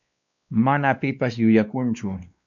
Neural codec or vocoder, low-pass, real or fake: codec, 16 kHz, 2 kbps, X-Codec, WavLM features, trained on Multilingual LibriSpeech; 7.2 kHz; fake